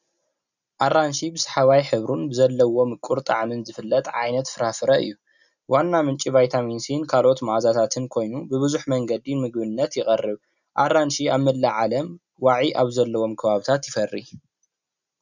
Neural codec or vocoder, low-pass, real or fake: none; 7.2 kHz; real